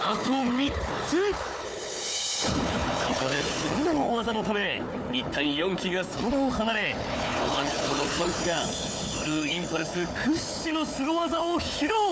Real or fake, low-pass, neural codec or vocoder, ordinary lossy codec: fake; none; codec, 16 kHz, 4 kbps, FunCodec, trained on Chinese and English, 50 frames a second; none